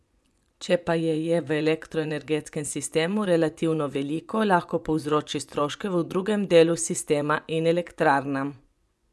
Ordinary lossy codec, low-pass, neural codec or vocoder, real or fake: none; none; vocoder, 24 kHz, 100 mel bands, Vocos; fake